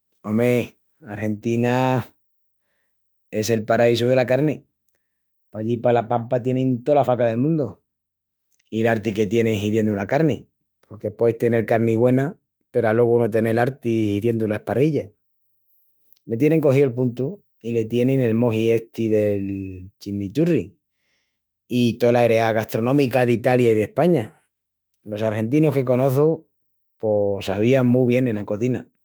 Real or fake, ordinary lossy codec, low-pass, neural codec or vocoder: fake; none; none; autoencoder, 48 kHz, 32 numbers a frame, DAC-VAE, trained on Japanese speech